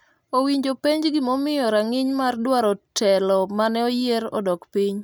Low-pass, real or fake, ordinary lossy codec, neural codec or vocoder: none; real; none; none